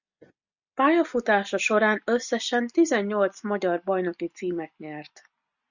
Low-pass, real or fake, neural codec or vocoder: 7.2 kHz; real; none